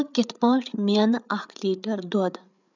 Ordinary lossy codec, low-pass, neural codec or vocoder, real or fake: none; 7.2 kHz; codec, 16 kHz, 8 kbps, FreqCodec, larger model; fake